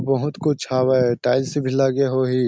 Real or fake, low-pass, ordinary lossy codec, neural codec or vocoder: real; none; none; none